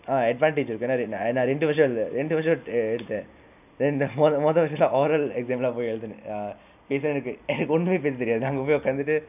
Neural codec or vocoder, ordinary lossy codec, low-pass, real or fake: none; none; 3.6 kHz; real